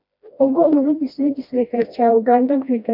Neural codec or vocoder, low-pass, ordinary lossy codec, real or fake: codec, 16 kHz, 1 kbps, FreqCodec, smaller model; 5.4 kHz; MP3, 48 kbps; fake